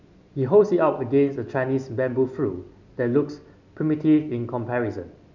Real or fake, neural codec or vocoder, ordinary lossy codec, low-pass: real; none; none; 7.2 kHz